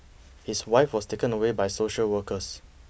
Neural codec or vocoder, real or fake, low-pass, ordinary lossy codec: none; real; none; none